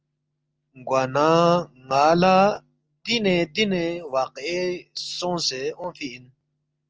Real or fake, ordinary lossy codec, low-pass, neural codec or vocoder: real; Opus, 24 kbps; 7.2 kHz; none